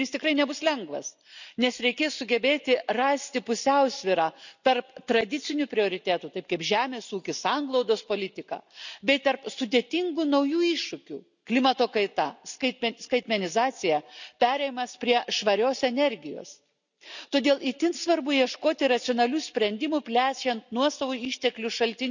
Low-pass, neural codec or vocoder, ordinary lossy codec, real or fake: 7.2 kHz; none; none; real